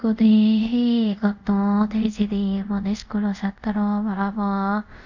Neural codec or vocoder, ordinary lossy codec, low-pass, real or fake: codec, 24 kHz, 0.5 kbps, DualCodec; none; 7.2 kHz; fake